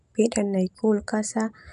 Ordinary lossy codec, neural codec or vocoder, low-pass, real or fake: none; none; 10.8 kHz; real